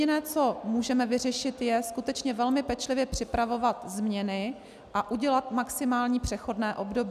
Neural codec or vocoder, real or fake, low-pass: none; real; 14.4 kHz